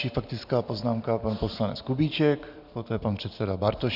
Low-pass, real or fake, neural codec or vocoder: 5.4 kHz; real; none